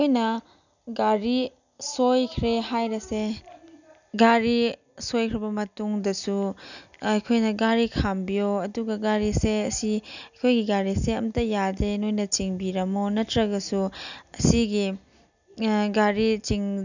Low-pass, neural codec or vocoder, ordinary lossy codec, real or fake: 7.2 kHz; none; none; real